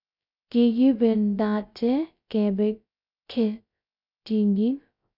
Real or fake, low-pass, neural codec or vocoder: fake; 5.4 kHz; codec, 16 kHz, 0.2 kbps, FocalCodec